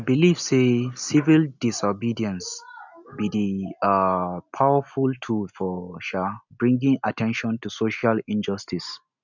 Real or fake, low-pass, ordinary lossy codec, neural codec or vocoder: real; 7.2 kHz; none; none